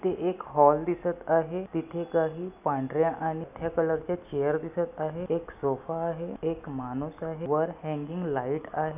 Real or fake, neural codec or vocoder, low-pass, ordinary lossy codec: real; none; 3.6 kHz; none